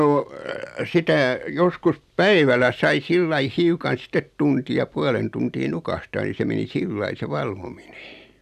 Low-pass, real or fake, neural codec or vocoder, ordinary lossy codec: 14.4 kHz; real; none; none